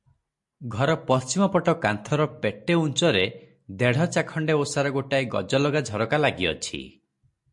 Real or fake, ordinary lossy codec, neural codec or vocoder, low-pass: real; MP3, 48 kbps; none; 10.8 kHz